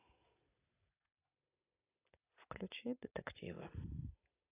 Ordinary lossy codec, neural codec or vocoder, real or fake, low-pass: AAC, 32 kbps; none; real; 3.6 kHz